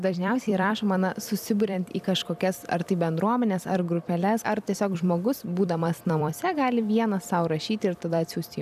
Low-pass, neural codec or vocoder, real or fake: 14.4 kHz; vocoder, 44.1 kHz, 128 mel bands every 256 samples, BigVGAN v2; fake